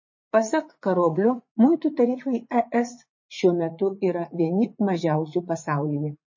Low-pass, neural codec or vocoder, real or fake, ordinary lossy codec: 7.2 kHz; vocoder, 44.1 kHz, 80 mel bands, Vocos; fake; MP3, 32 kbps